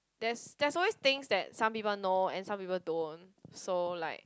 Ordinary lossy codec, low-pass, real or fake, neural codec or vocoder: none; none; real; none